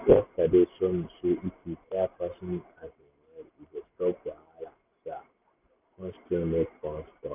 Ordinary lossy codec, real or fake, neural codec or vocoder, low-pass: none; real; none; 3.6 kHz